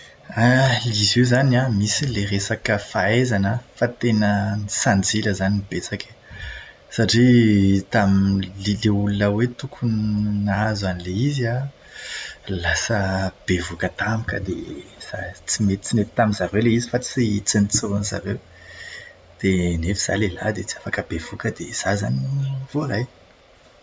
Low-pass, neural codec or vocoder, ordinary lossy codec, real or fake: none; none; none; real